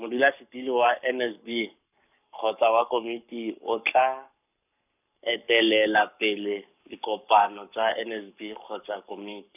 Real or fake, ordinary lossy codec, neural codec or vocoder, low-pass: fake; none; codec, 44.1 kHz, 7.8 kbps, Pupu-Codec; 3.6 kHz